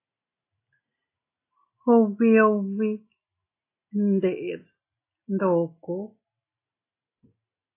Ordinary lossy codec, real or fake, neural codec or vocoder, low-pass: AAC, 24 kbps; real; none; 3.6 kHz